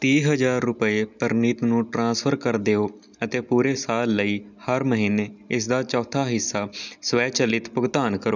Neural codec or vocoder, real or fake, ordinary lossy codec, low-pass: none; real; none; 7.2 kHz